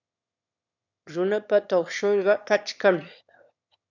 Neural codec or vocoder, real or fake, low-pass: autoencoder, 22.05 kHz, a latent of 192 numbers a frame, VITS, trained on one speaker; fake; 7.2 kHz